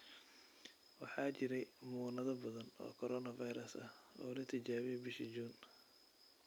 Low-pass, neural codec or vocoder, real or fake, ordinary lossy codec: none; vocoder, 44.1 kHz, 128 mel bands every 256 samples, BigVGAN v2; fake; none